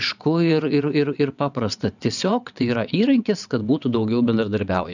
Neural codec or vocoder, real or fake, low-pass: vocoder, 22.05 kHz, 80 mel bands, WaveNeXt; fake; 7.2 kHz